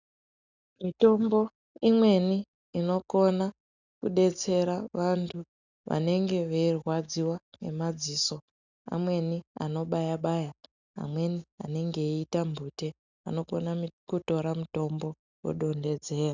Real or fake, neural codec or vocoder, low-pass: real; none; 7.2 kHz